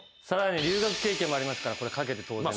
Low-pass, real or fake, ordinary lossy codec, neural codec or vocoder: none; real; none; none